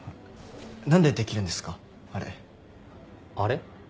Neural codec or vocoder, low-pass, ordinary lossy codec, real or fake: none; none; none; real